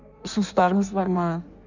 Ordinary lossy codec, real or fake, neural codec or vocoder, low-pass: none; fake; codec, 16 kHz in and 24 kHz out, 1.1 kbps, FireRedTTS-2 codec; 7.2 kHz